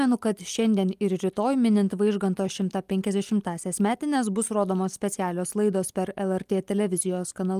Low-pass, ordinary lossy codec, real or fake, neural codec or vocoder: 14.4 kHz; Opus, 32 kbps; real; none